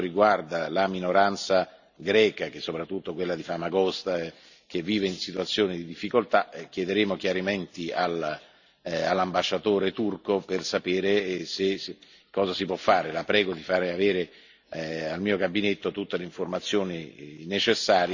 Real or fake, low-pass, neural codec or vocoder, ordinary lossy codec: real; 7.2 kHz; none; none